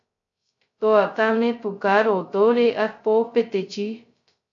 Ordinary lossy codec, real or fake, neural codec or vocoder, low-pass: AAC, 64 kbps; fake; codec, 16 kHz, 0.2 kbps, FocalCodec; 7.2 kHz